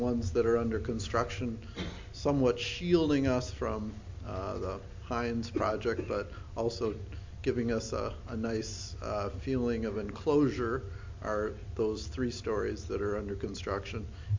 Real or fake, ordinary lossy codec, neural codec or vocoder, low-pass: real; MP3, 48 kbps; none; 7.2 kHz